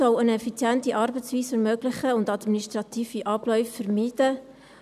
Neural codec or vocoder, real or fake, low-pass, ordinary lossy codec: none; real; 14.4 kHz; none